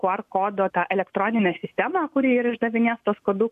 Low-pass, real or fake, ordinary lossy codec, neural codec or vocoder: 14.4 kHz; real; AAC, 96 kbps; none